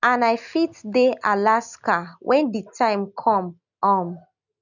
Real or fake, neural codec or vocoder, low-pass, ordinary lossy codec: real; none; 7.2 kHz; none